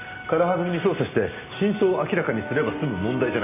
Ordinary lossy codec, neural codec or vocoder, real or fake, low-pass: none; none; real; 3.6 kHz